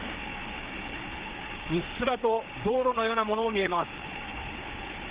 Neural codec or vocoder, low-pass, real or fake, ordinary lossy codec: codec, 16 kHz, 4 kbps, FreqCodec, larger model; 3.6 kHz; fake; Opus, 16 kbps